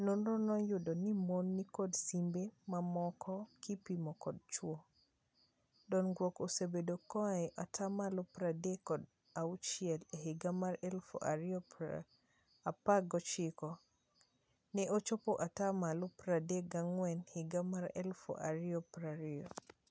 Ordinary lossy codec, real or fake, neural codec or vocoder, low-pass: none; real; none; none